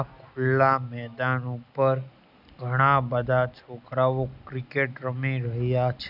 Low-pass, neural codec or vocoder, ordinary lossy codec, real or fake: 5.4 kHz; none; none; real